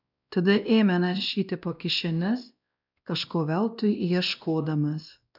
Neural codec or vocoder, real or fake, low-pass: codec, 16 kHz, 1 kbps, X-Codec, WavLM features, trained on Multilingual LibriSpeech; fake; 5.4 kHz